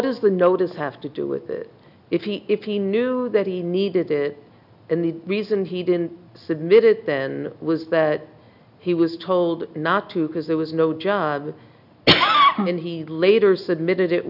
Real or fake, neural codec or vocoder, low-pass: real; none; 5.4 kHz